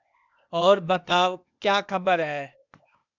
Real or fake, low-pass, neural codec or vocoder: fake; 7.2 kHz; codec, 16 kHz, 0.8 kbps, ZipCodec